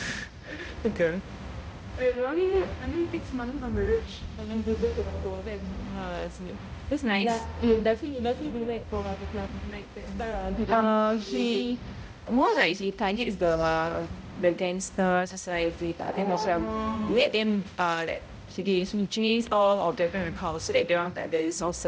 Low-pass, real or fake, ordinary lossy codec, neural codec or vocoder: none; fake; none; codec, 16 kHz, 0.5 kbps, X-Codec, HuBERT features, trained on balanced general audio